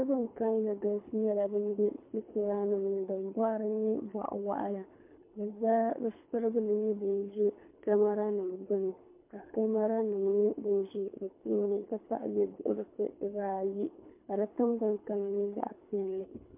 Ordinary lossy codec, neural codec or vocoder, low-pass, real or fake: MP3, 32 kbps; codec, 24 kHz, 3 kbps, HILCodec; 3.6 kHz; fake